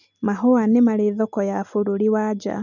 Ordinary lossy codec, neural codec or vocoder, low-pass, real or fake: none; none; 7.2 kHz; real